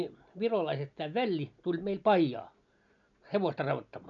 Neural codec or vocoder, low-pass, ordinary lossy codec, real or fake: none; 7.2 kHz; MP3, 96 kbps; real